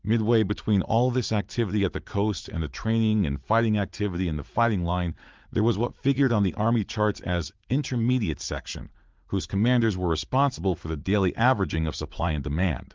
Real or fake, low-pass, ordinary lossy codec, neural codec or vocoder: fake; 7.2 kHz; Opus, 32 kbps; vocoder, 44.1 kHz, 80 mel bands, Vocos